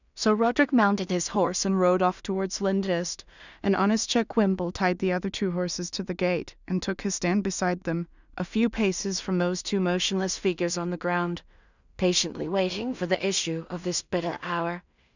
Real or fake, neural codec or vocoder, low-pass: fake; codec, 16 kHz in and 24 kHz out, 0.4 kbps, LongCat-Audio-Codec, two codebook decoder; 7.2 kHz